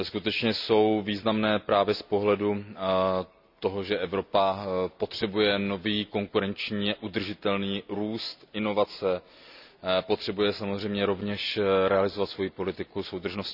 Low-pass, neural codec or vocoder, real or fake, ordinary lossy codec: 5.4 kHz; none; real; none